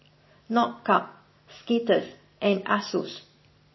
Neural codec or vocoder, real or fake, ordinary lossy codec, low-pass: none; real; MP3, 24 kbps; 7.2 kHz